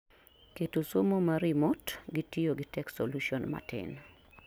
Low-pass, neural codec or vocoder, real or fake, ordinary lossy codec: none; none; real; none